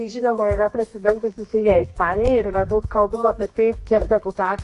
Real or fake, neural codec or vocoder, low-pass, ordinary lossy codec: fake; codec, 24 kHz, 0.9 kbps, WavTokenizer, medium music audio release; 10.8 kHz; AAC, 48 kbps